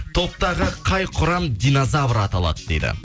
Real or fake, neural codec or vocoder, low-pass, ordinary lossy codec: real; none; none; none